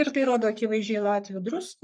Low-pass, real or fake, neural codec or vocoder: 9.9 kHz; fake; codec, 44.1 kHz, 3.4 kbps, Pupu-Codec